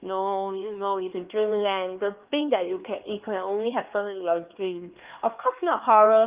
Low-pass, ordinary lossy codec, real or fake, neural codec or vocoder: 3.6 kHz; Opus, 32 kbps; fake; codec, 16 kHz, 2 kbps, X-Codec, HuBERT features, trained on LibriSpeech